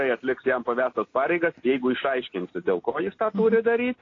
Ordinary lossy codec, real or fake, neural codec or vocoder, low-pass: AAC, 32 kbps; real; none; 7.2 kHz